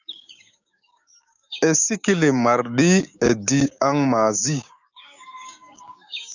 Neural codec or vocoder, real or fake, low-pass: codec, 16 kHz, 6 kbps, DAC; fake; 7.2 kHz